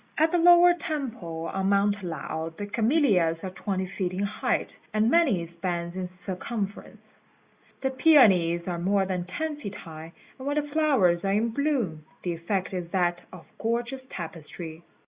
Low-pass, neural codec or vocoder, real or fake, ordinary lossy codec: 3.6 kHz; none; real; Opus, 64 kbps